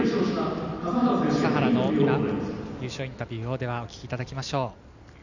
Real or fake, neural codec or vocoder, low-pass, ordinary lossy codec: real; none; 7.2 kHz; none